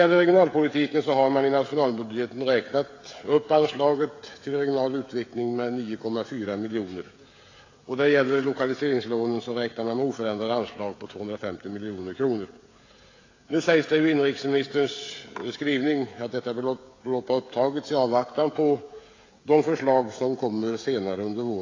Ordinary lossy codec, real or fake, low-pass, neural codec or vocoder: AAC, 32 kbps; fake; 7.2 kHz; codec, 16 kHz, 16 kbps, FreqCodec, smaller model